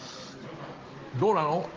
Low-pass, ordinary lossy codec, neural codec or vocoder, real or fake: 7.2 kHz; Opus, 16 kbps; none; real